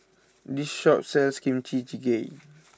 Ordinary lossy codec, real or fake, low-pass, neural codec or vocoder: none; real; none; none